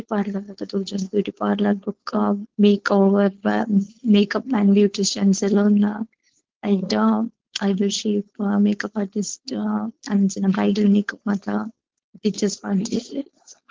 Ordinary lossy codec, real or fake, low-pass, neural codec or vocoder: Opus, 16 kbps; fake; 7.2 kHz; codec, 16 kHz, 4.8 kbps, FACodec